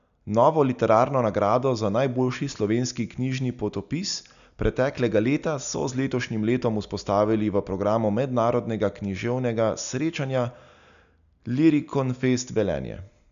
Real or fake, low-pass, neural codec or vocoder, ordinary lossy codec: real; 7.2 kHz; none; none